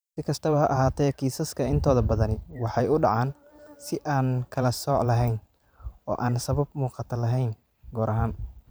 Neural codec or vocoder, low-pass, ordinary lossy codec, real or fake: vocoder, 44.1 kHz, 128 mel bands every 512 samples, BigVGAN v2; none; none; fake